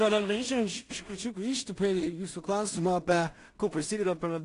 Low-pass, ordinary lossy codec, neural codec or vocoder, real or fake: 10.8 kHz; AAC, 48 kbps; codec, 16 kHz in and 24 kHz out, 0.4 kbps, LongCat-Audio-Codec, two codebook decoder; fake